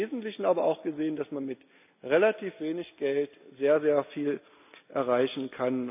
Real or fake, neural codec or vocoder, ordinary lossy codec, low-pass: real; none; none; 3.6 kHz